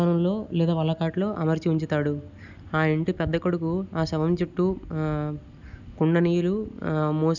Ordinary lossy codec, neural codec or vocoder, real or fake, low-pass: none; none; real; 7.2 kHz